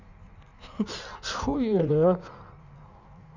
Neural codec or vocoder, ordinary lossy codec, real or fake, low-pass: codec, 16 kHz in and 24 kHz out, 1.1 kbps, FireRedTTS-2 codec; none; fake; 7.2 kHz